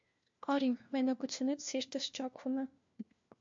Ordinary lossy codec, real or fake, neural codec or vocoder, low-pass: MP3, 48 kbps; fake; codec, 16 kHz, 1 kbps, FunCodec, trained on LibriTTS, 50 frames a second; 7.2 kHz